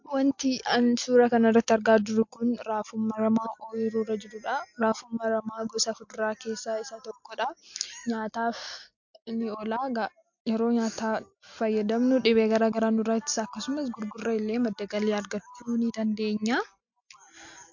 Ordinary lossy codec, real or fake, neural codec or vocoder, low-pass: MP3, 48 kbps; real; none; 7.2 kHz